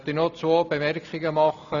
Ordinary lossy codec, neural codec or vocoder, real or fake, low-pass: none; none; real; 7.2 kHz